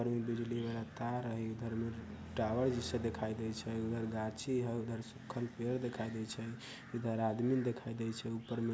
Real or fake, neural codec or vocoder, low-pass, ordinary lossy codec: real; none; none; none